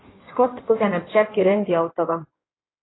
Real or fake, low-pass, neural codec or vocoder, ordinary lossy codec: fake; 7.2 kHz; codec, 16 kHz in and 24 kHz out, 1.1 kbps, FireRedTTS-2 codec; AAC, 16 kbps